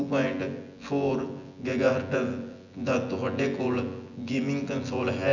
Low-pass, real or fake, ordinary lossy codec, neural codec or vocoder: 7.2 kHz; fake; none; vocoder, 24 kHz, 100 mel bands, Vocos